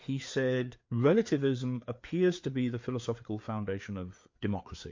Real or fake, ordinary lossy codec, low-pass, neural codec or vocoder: fake; MP3, 48 kbps; 7.2 kHz; codec, 16 kHz, 4 kbps, FreqCodec, larger model